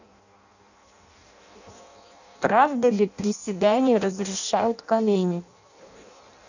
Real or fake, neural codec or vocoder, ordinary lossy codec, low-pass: fake; codec, 16 kHz in and 24 kHz out, 0.6 kbps, FireRedTTS-2 codec; none; 7.2 kHz